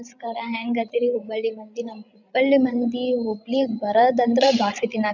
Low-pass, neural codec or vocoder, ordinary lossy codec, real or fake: 7.2 kHz; codec, 16 kHz, 16 kbps, FreqCodec, larger model; none; fake